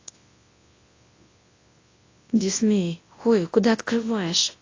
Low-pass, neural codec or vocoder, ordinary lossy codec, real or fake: 7.2 kHz; codec, 24 kHz, 0.9 kbps, WavTokenizer, large speech release; AAC, 32 kbps; fake